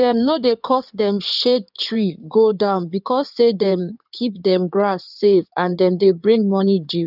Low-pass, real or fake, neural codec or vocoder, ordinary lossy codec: 5.4 kHz; fake; codec, 16 kHz in and 24 kHz out, 2.2 kbps, FireRedTTS-2 codec; none